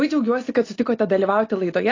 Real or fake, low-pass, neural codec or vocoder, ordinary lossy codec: real; 7.2 kHz; none; AAC, 32 kbps